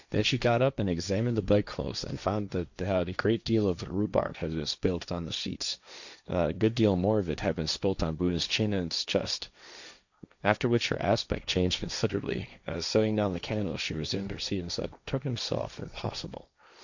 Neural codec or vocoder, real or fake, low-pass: codec, 16 kHz, 1.1 kbps, Voila-Tokenizer; fake; 7.2 kHz